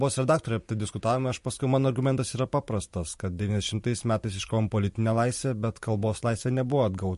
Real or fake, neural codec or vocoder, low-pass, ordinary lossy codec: fake; vocoder, 44.1 kHz, 128 mel bands every 512 samples, BigVGAN v2; 14.4 kHz; MP3, 48 kbps